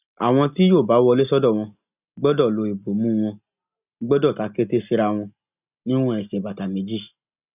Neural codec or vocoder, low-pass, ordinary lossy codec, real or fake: none; 3.6 kHz; none; real